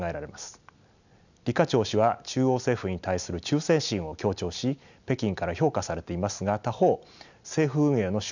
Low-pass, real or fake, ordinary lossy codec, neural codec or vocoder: 7.2 kHz; real; none; none